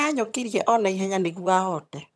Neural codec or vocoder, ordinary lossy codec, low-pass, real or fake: vocoder, 22.05 kHz, 80 mel bands, HiFi-GAN; none; none; fake